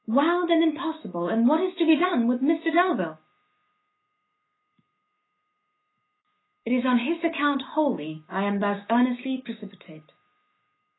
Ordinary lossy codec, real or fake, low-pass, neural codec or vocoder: AAC, 16 kbps; fake; 7.2 kHz; autoencoder, 48 kHz, 128 numbers a frame, DAC-VAE, trained on Japanese speech